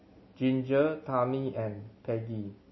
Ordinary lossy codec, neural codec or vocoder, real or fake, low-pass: MP3, 24 kbps; none; real; 7.2 kHz